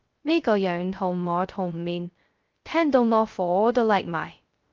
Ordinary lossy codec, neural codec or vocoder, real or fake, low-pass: Opus, 32 kbps; codec, 16 kHz, 0.2 kbps, FocalCodec; fake; 7.2 kHz